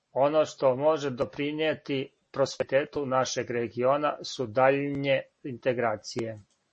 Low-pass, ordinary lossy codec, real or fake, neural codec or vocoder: 10.8 kHz; MP3, 32 kbps; real; none